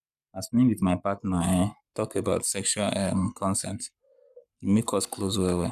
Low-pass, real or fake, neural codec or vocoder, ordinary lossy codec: 14.4 kHz; fake; codec, 44.1 kHz, 7.8 kbps, Pupu-Codec; none